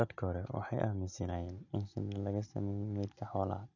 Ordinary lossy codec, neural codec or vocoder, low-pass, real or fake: none; none; 7.2 kHz; real